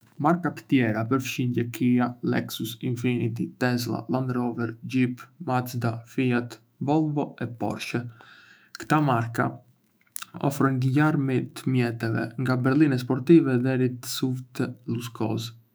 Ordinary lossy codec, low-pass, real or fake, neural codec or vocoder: none; none; fake; autoencoder, 48 kHz, 128 numbers a frame, DAC-VAE, trained on Japanese speech